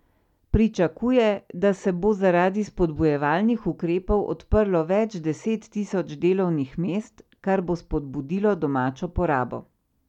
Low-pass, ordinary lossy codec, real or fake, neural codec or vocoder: 19.8 kHz; none; real; none